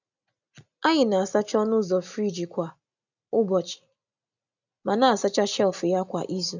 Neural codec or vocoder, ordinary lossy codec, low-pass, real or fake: none; none; 7.2 kHz; real